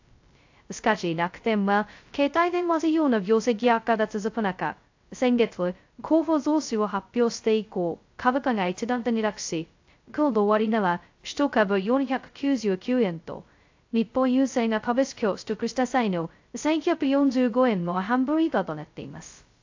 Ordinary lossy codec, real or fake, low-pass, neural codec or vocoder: AAC, 48 kbps; fake; 7.2 kHz; codec, 16 kHz, 0.2 kbps, FocalCodec